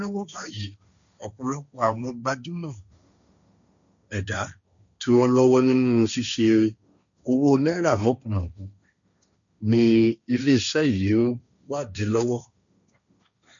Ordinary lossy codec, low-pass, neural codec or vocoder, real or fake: none; 7.2 kHz; codec, 16 kHz, 1.1 kbps, Voila-Tokenizer; fake